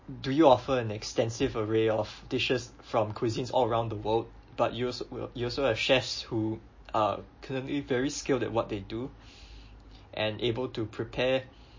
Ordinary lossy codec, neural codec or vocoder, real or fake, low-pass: MP3, 32 kbps; none; real; 7.2 kHz